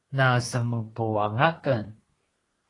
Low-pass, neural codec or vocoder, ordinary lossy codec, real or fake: 10.8 kHz; codec, 24 kHz, 1 kbps, SNAC; AAC, 32 kbps; fake